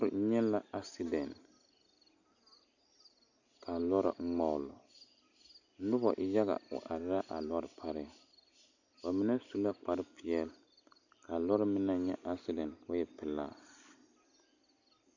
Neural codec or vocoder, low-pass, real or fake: none; 7.2 kHz; real